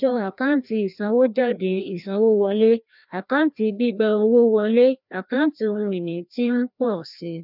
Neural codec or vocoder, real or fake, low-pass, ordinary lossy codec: codec, 16 kHz, 1 kbps, FreqCodec, larger model; fake; 5.4 kHz; none